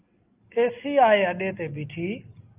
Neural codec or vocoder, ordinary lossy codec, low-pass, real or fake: none; Opus, 24 kbps; 3.6 kHz; real